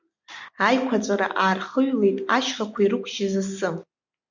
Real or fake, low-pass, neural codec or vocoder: real; 7.2 kHz; none